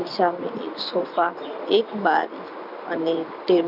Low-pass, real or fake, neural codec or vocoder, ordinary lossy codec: 5.4 kHz; real; none; none